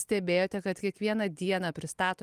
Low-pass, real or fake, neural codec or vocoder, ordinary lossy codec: 14.4 kHz; real; none; Opus, 32 kbps